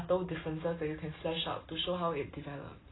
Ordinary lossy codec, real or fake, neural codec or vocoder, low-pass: AAC, 16 kbps; real; none; 7.2 kHz